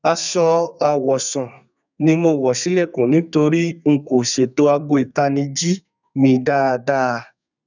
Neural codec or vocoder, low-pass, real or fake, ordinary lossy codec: codec, 32 kHz, 1.9 kbps, SNAC; 7.2 kHz; fake; none